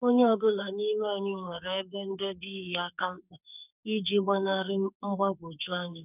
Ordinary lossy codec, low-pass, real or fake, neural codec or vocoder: none; 3.6 kHz; fake; codec, 44.1 kHz, 2.6 kbps, SNAC